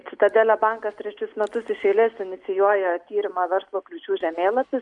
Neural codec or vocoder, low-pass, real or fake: none; 10.8 kHz; real